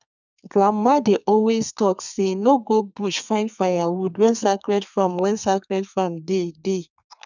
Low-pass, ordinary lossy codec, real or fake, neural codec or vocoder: 7.2 kHz; none; fake; codec, 32 kHz, 1.9 kbps, SNAC